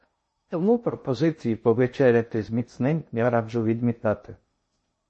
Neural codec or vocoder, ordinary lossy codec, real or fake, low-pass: codec, 16 kHz in and 24 kHz out, 0.6 kbps, FocalCodec, streaming, 2048 codes; MP3, 32 kbps; fake; 10.8 kHz